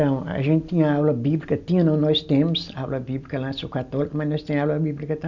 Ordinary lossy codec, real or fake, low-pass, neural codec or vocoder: none; real; 7.2 kHz; none